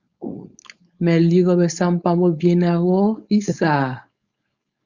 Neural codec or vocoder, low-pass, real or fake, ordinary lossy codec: codec, 16 kHz, 4.8 kbps, FACodec; 7.2 kHz; fake; Opus, 64 kbps